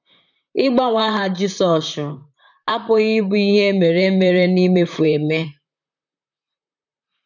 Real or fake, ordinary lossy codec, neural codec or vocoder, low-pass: fake; none; vocoder, 44.1 kHz, 128 mel bands, Pupu-Vocoder; 7.2 kHz